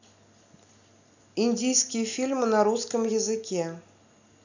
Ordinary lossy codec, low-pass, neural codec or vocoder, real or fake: none; 7.2 kHz; none; real